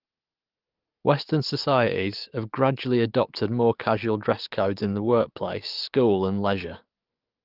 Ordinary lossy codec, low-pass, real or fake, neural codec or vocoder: Opus, 32 kbps; 5.4 kHz; fake; codec, 44.1 kHz, 7.8 kbps, DAC